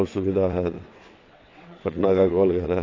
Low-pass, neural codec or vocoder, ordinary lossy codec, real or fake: 7.2 kHz; vocoder, 22.05 kHz, 80 mel bands, WaveNeXt; AAC, 32 kbps; fake